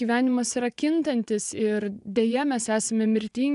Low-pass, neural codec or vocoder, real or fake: 10.8 kHz; vocoder, 24 kHz, 100 mel bands, Vocos; fake